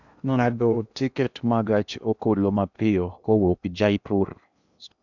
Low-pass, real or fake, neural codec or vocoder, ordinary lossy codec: 7.2 kHz; fake; codec, 16 kHz in and 24 kHz out, 0.8 kbps, FocalCodec, streaming, 65536 codes; none